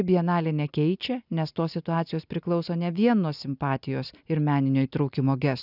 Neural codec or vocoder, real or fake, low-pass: none; real; 5.4 kHz